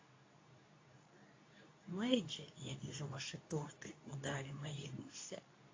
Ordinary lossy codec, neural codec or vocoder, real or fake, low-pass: AAC, 32 kbps; codec, 24 kHz, 0.9 kbps, WavTokenizer, medium speech release version 2; fake; 7.2 kHz